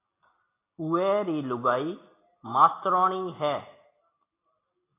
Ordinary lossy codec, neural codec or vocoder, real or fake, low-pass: AAC, 24 kbps; none; real; 3.6 kHz